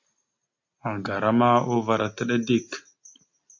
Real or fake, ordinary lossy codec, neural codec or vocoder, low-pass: real; MP3, 48 kbps; none; 7.2 kHz